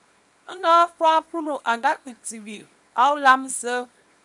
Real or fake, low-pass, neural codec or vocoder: fake; 10.8 kHz; codec, 24 kHz, 0.9 kbps, WavTokenizer, small release